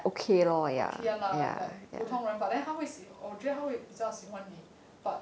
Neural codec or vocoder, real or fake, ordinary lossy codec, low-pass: none; real; none; none